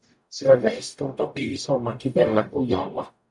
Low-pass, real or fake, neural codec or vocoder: 10.8 kHz; fake; codec, 44.1 kHz, 0.9 kbps, DAC